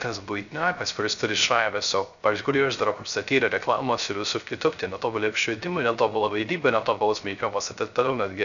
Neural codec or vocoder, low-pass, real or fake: codec, 16 kHz, 0.3 kbps, FocalCodec; 7.2 kHz; fake